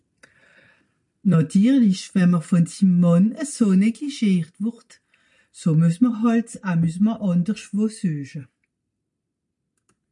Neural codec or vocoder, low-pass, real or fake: none; 10.8 kHz; real